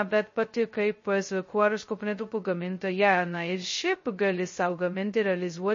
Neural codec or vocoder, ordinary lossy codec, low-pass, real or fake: codec, 16 kHz, 0.2 kbps, FocalCodec; MP3, 32 kbps; 7.2 kHz; fake